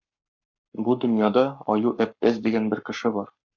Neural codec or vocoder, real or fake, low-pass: codec, 44.1 kHz, 7.8 kbps, Pupu-Codec; fake; 7.2 kHz